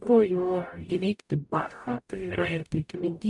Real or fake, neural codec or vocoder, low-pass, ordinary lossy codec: fake; codec, 44.1 kHz, 0.9 kbps, DAC; 10.8 kHz; MP3, 96 kbps